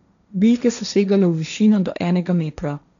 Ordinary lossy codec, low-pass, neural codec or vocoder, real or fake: none; 7.2 kHz; codec, 16 kHz, 1.1 kbps, Voila-Tokenizer; fake